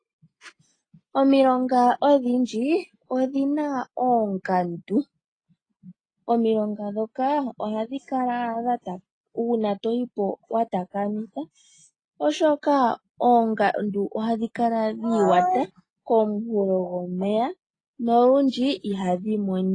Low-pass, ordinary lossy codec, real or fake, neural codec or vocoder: 9.9 kHz; AAC, 32 kbps; real; none